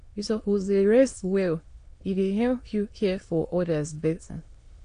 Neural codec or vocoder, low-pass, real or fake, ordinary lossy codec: autoencoder, 22.05 kHz, a latent of 192 numbers a frame, VITS, trained on many speakers; 9.9 kHz; fake; AAC, 48 kbps